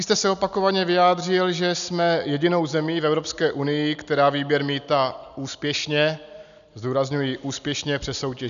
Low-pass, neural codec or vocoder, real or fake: 7.2 kHz; none; real